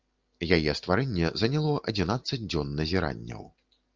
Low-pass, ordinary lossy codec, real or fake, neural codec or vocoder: 7.2 kHz; Opus, 24 kbps; real; none